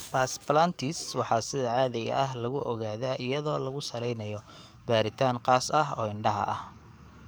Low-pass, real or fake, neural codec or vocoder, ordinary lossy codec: none; fake; codec, 44.1 kHz, 7.8 kbps, DAC; none